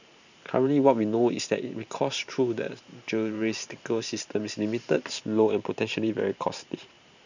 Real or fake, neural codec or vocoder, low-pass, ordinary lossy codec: fake; vocoder, 44.1 kHz, 128 mel bands every 512 samples, BigVGAN v2; 7.2 kHz; none